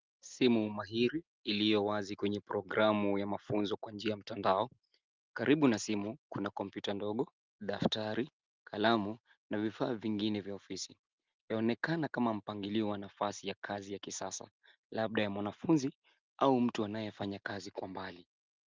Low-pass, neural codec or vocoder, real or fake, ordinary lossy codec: 7.2 kHz; none; real; Opus, 24 kbps